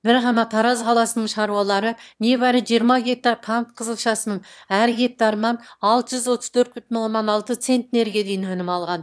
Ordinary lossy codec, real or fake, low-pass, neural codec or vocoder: none; fake; none; autoencoder, 22.05 kHz, a latent of 192 numbers a frame, VITS, trained on one speaker